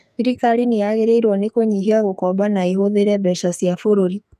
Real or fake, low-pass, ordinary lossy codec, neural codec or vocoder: fake; 14.4 kHz; none; codec, 44.1 kHz, 2.6 kbps, SNAC